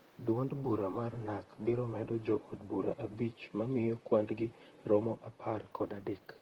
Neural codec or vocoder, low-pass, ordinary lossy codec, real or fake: vocoder, 44.1 kHz, 128 mel bands, Pupu-Vocoder; 19.8 kHz; Opus, 16 kbps; fake